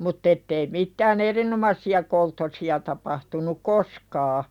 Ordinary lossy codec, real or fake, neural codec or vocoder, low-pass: none; real; none; 19.8 kHz